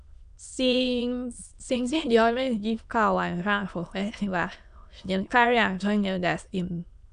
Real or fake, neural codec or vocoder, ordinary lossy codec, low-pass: fake; autoencoder, 22.05 kHz, a latent of 192 numbers a frame, VITS, trained on many speakers; none; 9.9 kHz